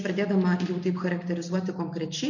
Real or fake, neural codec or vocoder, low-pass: real; none; 7.2 kHz